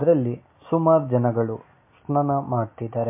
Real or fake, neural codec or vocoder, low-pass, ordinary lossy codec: real; none; 3.6 kHz; MP3, 24 kbps